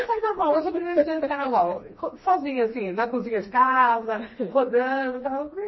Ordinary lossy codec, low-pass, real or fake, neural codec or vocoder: MP3, 24 kbps; 7.2 kHz; fake; codec, 16 kHz, 2 kbps, FreqCodec, smaller model